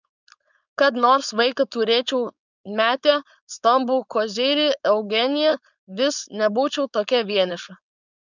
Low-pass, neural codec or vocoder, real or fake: 7.2 kHz; codec, 16 kHz, 4.8 kbps, FACodec; fake